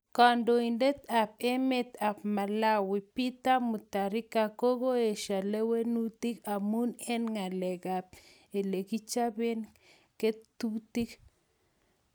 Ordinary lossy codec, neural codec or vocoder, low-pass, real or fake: none; none; none; real